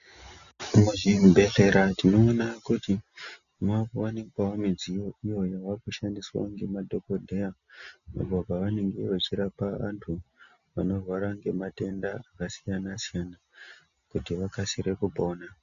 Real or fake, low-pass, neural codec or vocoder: real; 7.2 kHz; none